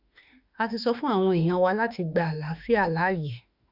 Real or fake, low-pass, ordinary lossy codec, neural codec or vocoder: fake; 5.4 kHz; none; autoencoder, 48 kHz, 32 numbers a frame, DAC-VAE, trained on Japanese speech